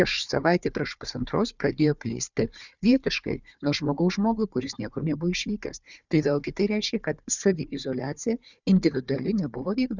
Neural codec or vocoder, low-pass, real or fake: codec, 16 kHz, 4 kbps, FunCodec, trained on Chinese and English, 50 frames a second; 7.2 kHz; fake